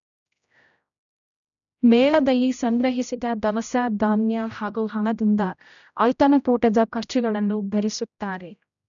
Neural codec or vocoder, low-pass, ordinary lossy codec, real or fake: codec, 16 kHz, 0.5 kbps, X-Codec, HuBERT features, trained on general audio; 7.2 kHz; none; fake